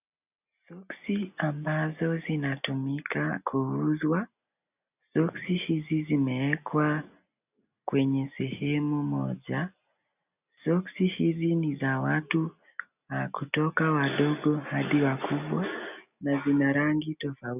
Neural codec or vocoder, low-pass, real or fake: none; 3.6 kHz; real